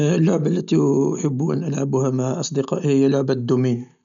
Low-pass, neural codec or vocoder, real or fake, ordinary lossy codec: 7.2 kHz; none; real; none